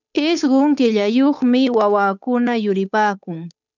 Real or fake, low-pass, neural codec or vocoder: fake; 7.2 kHz; codec, 16 kHz, 8 kbps, FunCodec, trained on Chinese and English, 25 frames a second